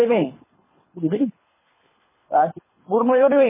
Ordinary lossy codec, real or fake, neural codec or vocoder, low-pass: MP3, 16 kbps; fake; codec, 24 kHz, 3 kbps, HILCodec; 3.6 kHz